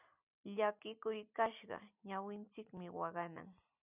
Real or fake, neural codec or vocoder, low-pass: fake; vocoder, 44.1 kHz, 128 mel bands every 256 samples, BigVGAN v2; 3.6 kHz